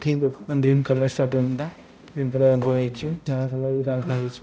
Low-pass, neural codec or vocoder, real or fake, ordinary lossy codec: none; codec, 16 kHz, 0.5 kbps, X-Codec, HuBERT features, trained on balanced general audio; fake; none